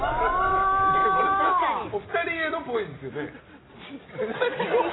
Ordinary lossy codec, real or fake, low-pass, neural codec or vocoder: AAC, 16 kbps; real; 7.2 kHz; none